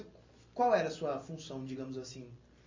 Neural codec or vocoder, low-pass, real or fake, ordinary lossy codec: none; 7.2 kHz; real; MP3, 32 kbps